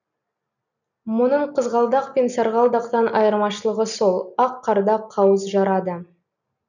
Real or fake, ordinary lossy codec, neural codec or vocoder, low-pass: real; none; none; 7.2 kHz